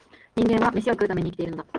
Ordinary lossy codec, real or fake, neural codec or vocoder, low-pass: Opus, 16 kbps; real; none; 9.9 kHz